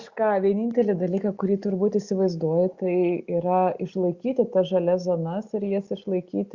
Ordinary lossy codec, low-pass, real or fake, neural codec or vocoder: Opus, 64 kbps; 7.2 kHz; real; none